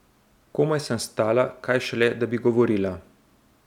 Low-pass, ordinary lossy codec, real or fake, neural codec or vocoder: 19.8 kHz; none; real; none